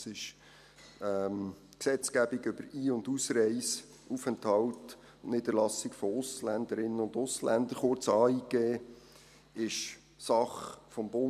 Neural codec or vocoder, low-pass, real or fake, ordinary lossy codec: none; 14.4 kHz; real; none